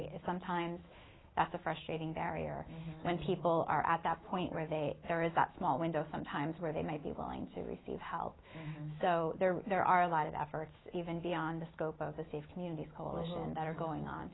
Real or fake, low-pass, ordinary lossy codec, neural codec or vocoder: fake; 7.2 kHz; AAC, 16 kbps; autoencoder, 48 kHz, 128 numbers a frame, DAC-VAE, trained on Japanese speech